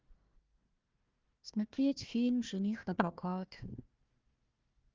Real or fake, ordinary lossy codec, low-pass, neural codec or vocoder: fake; Opus, 32 kbps; 7.2 kHz; codec, 16 kHz, 1 kbps, FunCodec, trained on Chinese and English, 50 frames a second